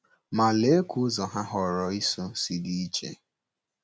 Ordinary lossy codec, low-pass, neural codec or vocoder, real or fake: none; none; none; real